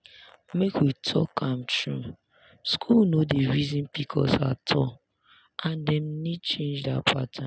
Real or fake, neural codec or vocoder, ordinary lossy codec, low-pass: real; none; none; none